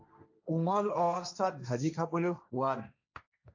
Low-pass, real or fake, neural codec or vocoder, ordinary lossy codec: 7.2 kHz; fake; codec, 16 kHz, 1.1 kbps, Voila-Tokenizer; AAC, 48 kbps